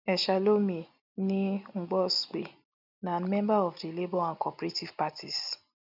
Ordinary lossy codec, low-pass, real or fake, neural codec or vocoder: none; 5.4 kHz; real; none